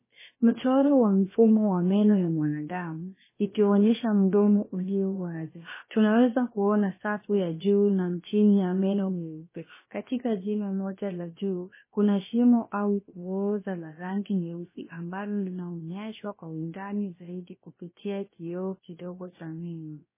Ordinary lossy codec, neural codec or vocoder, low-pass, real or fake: MP3, 16 kbps; codec, 16 kHz, about 1 kbps, DyCAST, with the encoder's durations; 3.6 kHz; fake